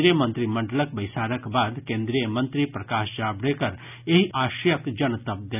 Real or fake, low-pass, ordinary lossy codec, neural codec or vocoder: real; 3.6 kHz; none; none